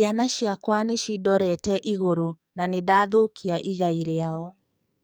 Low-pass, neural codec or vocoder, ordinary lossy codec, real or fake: none; codec, 44.1 kHz, 2.6 kbps, SNAC; none; fake